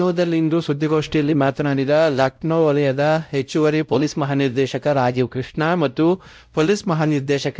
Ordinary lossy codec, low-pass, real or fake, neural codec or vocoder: none; none; fake; codec, 16 kHz, 0.5 kbps, X-Codec, WavLM features, trained on Multilingual LibriSpeech